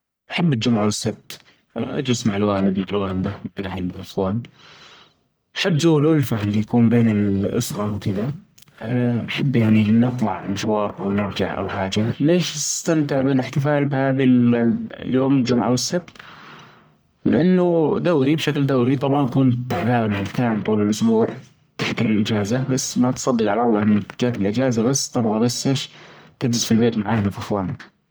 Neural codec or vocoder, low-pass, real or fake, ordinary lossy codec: codec, 44.1 kHz, 1.7 kbps, Pupu-Codec; none; fake; none